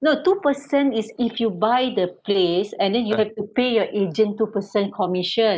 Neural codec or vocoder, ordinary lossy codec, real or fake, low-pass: none; none; real; none